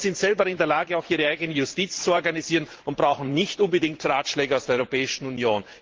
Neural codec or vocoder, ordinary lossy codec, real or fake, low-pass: vocoder, 44.1 kHz, 128 mel bands every 512 samples, BigVGAN v2; Opus, 16 kbps; fake; 7.2 kHz